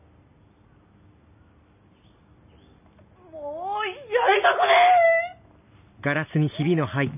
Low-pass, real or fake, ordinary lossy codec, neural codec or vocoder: 3.6 kHz; real; none; none